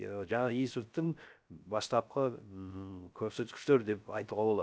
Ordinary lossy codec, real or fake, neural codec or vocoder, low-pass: none; fake; codec, 16 kHz, 0.3 kbps, FocalCodec; none